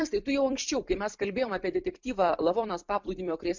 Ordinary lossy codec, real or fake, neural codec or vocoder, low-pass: MP3, 64 kbps; fake; vocoder, 44.1 kHz, 128 mel bands every 256 samples, BigVGAN v2; 7.2 kHz